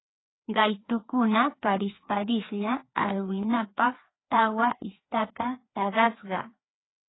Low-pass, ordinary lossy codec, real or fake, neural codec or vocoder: 7.2 kHz; AAC, 16 kbps; fake; codec, 16 kHz, 2 kbps, FreqCodec, larger model